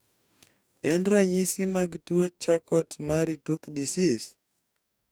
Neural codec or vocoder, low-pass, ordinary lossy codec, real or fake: codec, 44.1 kHz, 2.6 kbps, DAC; none; none; fake